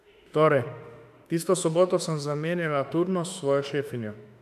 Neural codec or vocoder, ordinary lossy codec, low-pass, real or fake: autoencoder, 48 kHz, 32 numbers a frame, DAC-VAE, trained on Japanese speech; none; 14.4 kHz; fake